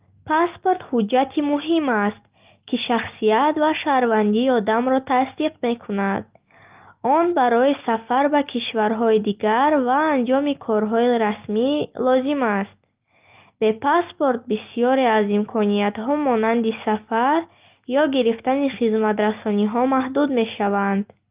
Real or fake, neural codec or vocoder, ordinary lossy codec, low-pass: real; none; Opus, 24 kbps; 3.6 kHz